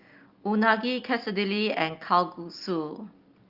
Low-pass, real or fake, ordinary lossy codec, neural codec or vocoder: 5.4 kHz; real; Opus, 32 kbps; none